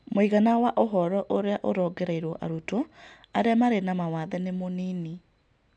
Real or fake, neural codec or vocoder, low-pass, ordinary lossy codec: real; none; 9.9 kHz; none